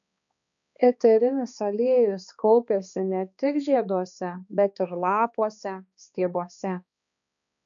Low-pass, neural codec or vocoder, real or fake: 7.2 kHz; codec, 16 kHz, 2 kbps, X-Codec, HuBERT features, trained on balanced general audio; fake